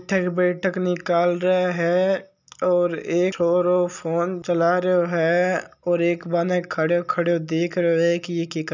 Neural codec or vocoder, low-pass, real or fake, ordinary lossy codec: none; 7.2 kHz; real; none